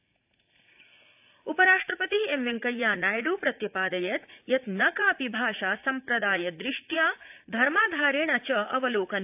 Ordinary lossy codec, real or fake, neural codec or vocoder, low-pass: AAC, 32 kbps; fake; vocoder, 44.1 kHz, 80 mel bands, Vocos; 3.6 kHz